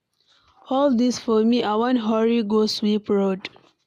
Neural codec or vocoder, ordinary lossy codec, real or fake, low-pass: none; AAC, 96 kbps; real; 14.4 kHz